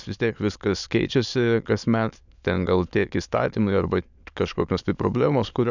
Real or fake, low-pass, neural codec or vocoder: fake; 7.2 kHz; autoencoder, 22.05 kHz, a latent of 192 numbers a frame, VITS, trained on many speakers